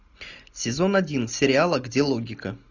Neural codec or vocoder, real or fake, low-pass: none; real; 7.2 kHz